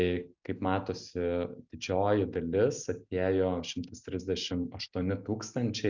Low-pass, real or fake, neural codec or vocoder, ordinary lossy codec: 7.2 kHz; real; none; Opus, 64 kbps